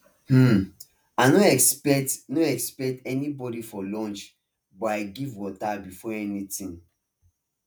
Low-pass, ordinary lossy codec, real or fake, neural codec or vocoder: none; none; fake; vocoder, 48 kHz, 128 mel bands, Vocos